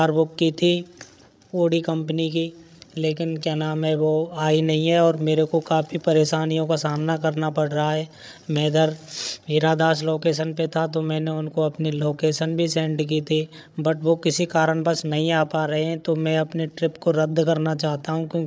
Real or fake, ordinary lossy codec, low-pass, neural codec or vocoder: fake; none; none; codec, 16 kHz, 8 kbps, FreqCodec, larger model